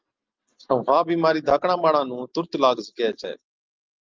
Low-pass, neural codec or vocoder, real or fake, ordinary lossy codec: 7.2 kHz; none; real; Opus, 24 kbps